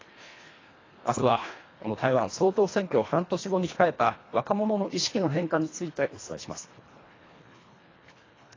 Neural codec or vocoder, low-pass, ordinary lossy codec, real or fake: codec, 24 kHz, 1.5 kbps, HILCodec; 7.2 kHz; AAC, 32 kbps; fake